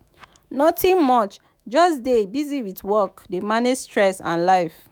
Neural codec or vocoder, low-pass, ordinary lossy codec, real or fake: autoencoder, 48 kHz, 128 numbers a frame, DAC-VAE, trained on Japanese speech; none; none; fake